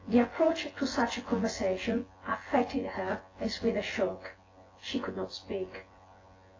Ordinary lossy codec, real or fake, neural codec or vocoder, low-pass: AAC, 32 kbps; fake; vocoder, 24 kHz, 100 mel bands, Vocos; 7.2 kHz